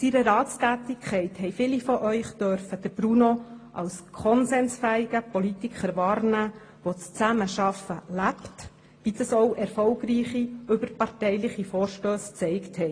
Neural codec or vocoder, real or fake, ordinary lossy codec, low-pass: none; real; AAC, 32 kbps; 9.9 kHz